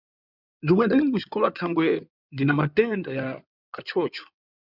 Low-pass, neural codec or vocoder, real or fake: 5.4 kHz; codec, 16 kHz in and 24 kHz out, 2.2 kbps, FireRedTTS-2 codec; fake